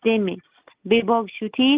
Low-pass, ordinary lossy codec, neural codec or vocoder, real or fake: 3.6 kHz; Opus, 32 kbps; none; real